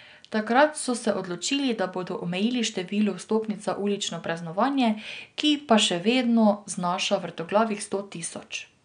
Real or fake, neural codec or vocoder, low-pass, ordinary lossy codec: real; none; 9.9 kHz; none